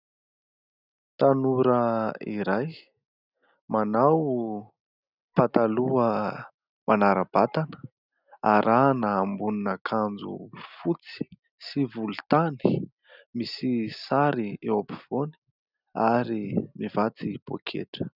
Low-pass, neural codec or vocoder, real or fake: 5.4 kHz; none; real